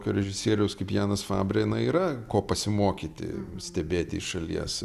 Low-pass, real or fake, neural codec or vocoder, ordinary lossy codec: 14.4 kHz; real; none; Opus, 64 kbps